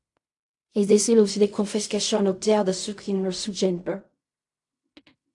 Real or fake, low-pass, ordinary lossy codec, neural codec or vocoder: fake; 10.8 kHz; AAC, 64 kbps; codec, 16 kHz in and 24 kHz out, 0.4 kbps, LongCat-Audio-Codec, fine tuned four codebook decoder